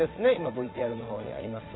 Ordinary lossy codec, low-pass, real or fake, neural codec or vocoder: AAC, 16 kbps; 7.2 kHz; fake; vocoder, 22.05 kHz, 80 mel bands, Vocos